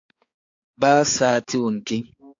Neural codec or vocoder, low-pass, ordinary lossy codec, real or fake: codec, 16 kHz, 4 kbps, X-Codec, HuBERT features, trained on balanced general audio; 7.2 kHz; AAC, 48 kbps; fake